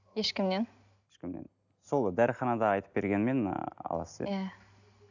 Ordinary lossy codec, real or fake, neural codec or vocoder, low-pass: none; real; none; 7.2 kHz